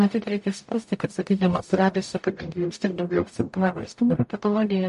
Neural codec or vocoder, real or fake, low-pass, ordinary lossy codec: codec, 44.1 kHz, 0.9 kbps, DAC; fake; 14.4 kHz; MP3, 48 kbps